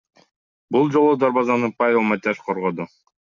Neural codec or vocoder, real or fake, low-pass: none; real; 7.2 kHz